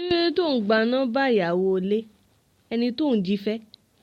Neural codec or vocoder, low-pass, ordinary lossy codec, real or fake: none; 19.8 kHz; MP3, 64 kbps; real